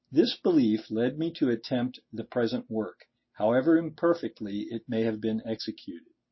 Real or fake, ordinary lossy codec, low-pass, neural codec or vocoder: real; MP3, 24 kbps; 7.2 kHz; none